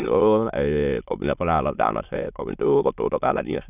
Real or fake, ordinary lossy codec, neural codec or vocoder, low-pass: fake; none; autoencoder, 22.05 kHz, a latent of 192 numbers a frame, VITS, trained on many speakers; 3.6 kHz